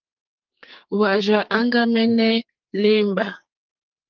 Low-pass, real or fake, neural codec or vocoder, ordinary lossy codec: 7.2 kHz; fake; codec, 44.1 kHz, 2.6 kbps, SNAC; Opus, 24 kbps